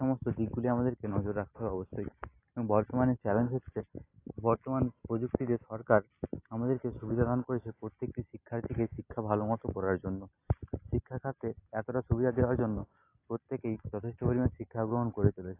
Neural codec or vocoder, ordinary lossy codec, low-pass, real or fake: none; AAC, 24 kbps; 3.6 kHz; real